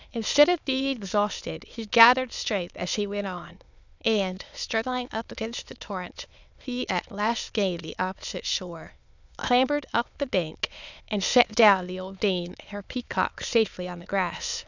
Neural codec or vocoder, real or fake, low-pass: autoencoder, 22.05 kHz, a latent of 192 numbers a frame, VITS, trained on many speakers; fake; 7.2 kHz